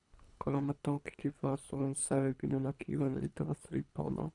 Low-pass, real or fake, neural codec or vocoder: 10.8 kHz; fake; codec, 24 kHz, 3 kbps, HILCodec